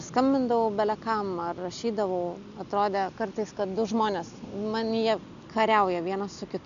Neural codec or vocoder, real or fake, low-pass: none; real; 7.2 kHz